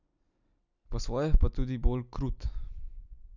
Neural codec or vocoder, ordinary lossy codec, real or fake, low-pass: vocoder, 44.1 kHz, 128 mel bands every 256 samples, BigVGAN v2; none; fake; 7.2 kHz